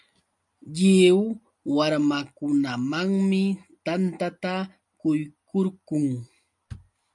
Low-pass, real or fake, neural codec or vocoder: 10.8 kHz; real; none